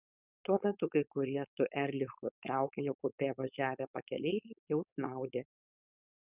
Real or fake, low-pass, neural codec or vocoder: fake; 3.6 kHz; codec, 16 kHz, 4.8 kbps, FACodec